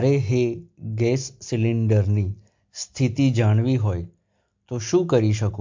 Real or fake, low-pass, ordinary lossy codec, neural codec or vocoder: real; 7.2 kHz; MP3, 48 kbps; none